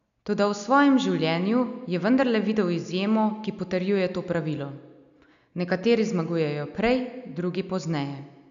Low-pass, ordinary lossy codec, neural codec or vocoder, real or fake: 7.2 kHz; none; none; real